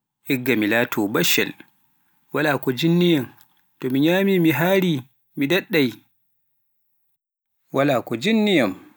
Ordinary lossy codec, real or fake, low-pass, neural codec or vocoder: none; real; none; none